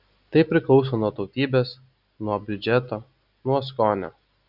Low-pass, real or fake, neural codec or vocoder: 5.4 kHz; real; none